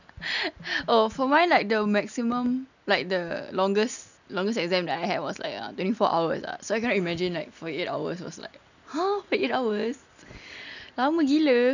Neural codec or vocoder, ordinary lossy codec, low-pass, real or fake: none; none; 7.2 kHz; real